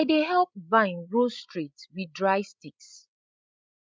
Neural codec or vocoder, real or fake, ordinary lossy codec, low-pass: codec, 16 kHz, 16 kbps, FreqCodec, larger model; fake; none; none